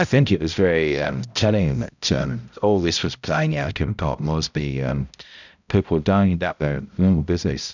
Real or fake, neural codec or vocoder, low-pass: fake; codec, 16 kHz, 0.5 kbps, X-Codec, HuBERT features, trained on balanced general audio; 7.2 kHz